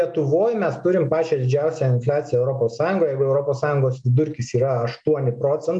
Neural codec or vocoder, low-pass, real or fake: none; 9.9 kHz; real